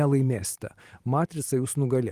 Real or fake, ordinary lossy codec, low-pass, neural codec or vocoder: fake; Opus, 24 kbps; 14.4 kHz; vocoder, 44.1 kHz, 128 mel bands every 512 samples, BigVGAN v2